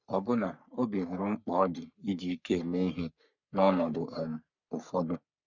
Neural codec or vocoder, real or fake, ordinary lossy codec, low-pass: codec, 44.1 kHz, 3.4 kbps, Pupu-Codec; fake; none; 7.2 kHz